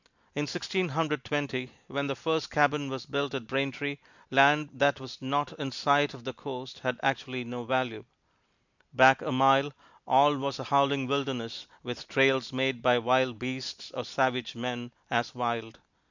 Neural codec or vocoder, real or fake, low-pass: none; real; 7.2 kHz